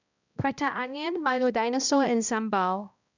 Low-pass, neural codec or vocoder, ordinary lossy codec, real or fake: 7.2 kHz; codec, 16 kHz, 1 kbps, X-Codec, HuBERT features, trained on balanced general audio; none; fake